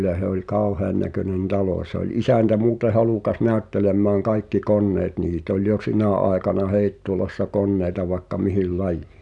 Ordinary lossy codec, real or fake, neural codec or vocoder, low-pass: none; real; none; 10.8 kHz